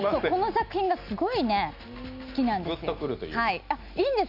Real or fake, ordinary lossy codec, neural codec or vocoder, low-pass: real; none; none; 5.4 kHz